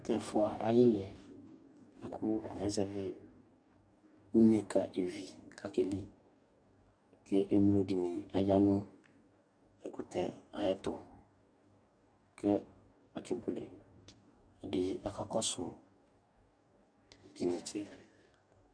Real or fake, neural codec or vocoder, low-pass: fake; codec, 44.1 kHz, 2.6 kbps, DAC; 9.9 kHz